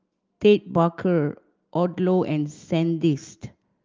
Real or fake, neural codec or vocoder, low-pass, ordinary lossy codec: real; none; 7.2 kHz; Opus, 32 kbps